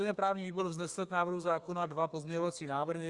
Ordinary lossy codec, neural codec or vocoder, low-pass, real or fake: Opus, 64 kbps; codec, 32 kHz, 1.9 kbps, SNAC; 10.8 kHz; fake